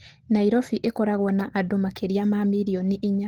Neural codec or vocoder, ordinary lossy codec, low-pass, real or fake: none; Opus, 16 kbps; 19.8 kHz; real